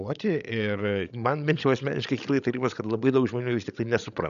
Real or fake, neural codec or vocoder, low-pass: fake; codec, 16 kHz, 16 kbps, FreqCodec, larger model; 7.2 kHz